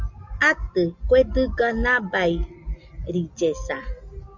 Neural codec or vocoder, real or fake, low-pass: none; real; 7.2 kHz